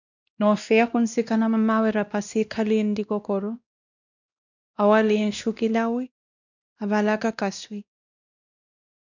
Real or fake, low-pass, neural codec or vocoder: fake; 7.2 kHz; codec, 16 kHz, 1 kbps, X-Codec, WavLM features, trained on Multilingual LibriSpeech